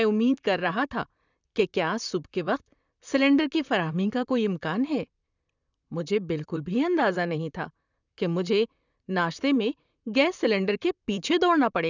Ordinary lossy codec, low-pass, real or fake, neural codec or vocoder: none; 7.2 kHz; fake; vocoder, 44.1 kHz, 128 mel bands, Pupu-Vocoder